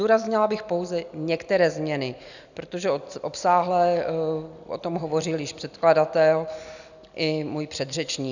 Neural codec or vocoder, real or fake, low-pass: none; real; 7.2 kHz